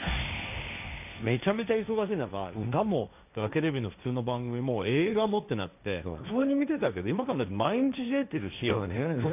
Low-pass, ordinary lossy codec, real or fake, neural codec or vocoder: 3.6 kHz; none; fake; codec, 16 kHz, 1.1 kbps, Voila-Tokenizer